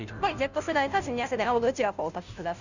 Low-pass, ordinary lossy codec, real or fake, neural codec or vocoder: 7.2 kHz; none; fake; codec, 16 kHz, 0.5 kbps, FunCodec, trained on Chinese and English, 25 frames a second